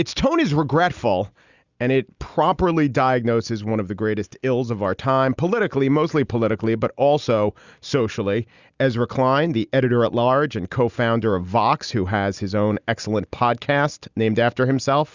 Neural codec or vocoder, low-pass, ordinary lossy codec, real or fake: none; 7.2 kHz; Opus, 64 kbps; real